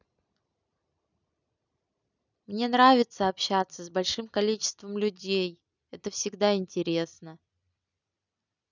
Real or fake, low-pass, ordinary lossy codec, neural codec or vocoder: real; 7.2 kHz; none; none